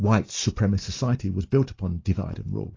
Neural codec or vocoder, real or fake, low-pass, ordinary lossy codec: none; real; 7.2 kHz; AAC, 32 kbps